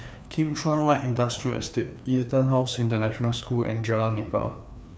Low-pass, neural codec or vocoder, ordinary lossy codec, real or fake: none; codec, 16 kHz, 2 kbps, FreqCodec, larger model; none; fake